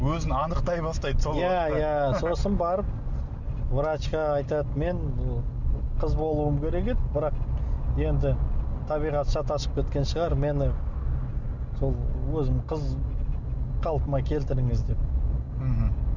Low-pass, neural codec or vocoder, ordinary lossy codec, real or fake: 7.2 kHz; none; none; real